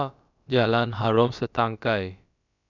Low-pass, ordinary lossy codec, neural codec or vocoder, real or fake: 7.2 kHz; none; codec, 16 kHz, about 1 kbps, DyCAST, with the encoder's durations; fake